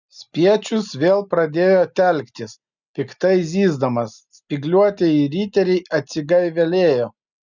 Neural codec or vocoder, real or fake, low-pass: none; real; 7.2 kHz